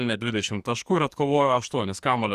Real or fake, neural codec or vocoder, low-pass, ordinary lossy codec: fake; codec, 44.1 kHz, 2.6 kbps, SNAC; 14.4 kHz; Opus, 64 kbps